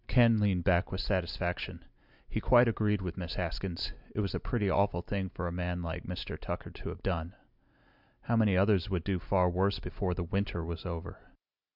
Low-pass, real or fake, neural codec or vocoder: 5.4 kHz; real; none